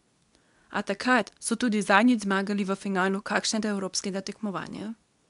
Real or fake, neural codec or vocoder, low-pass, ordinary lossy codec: fake; codec, 24 kHz, 0.9 kbps, WavTokenizer, medium speech release version 2; 10.8 kHz; none